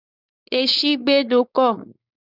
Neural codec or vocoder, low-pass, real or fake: codec, 16 kHz, 4.8 kbps, FACodec; 5.4 kHz; fake